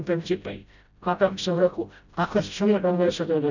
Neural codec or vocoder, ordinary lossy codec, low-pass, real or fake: codec, 16 kHz, 0.5 kbps, FreqCodec, smaller model; none; 7.2 kHz; fake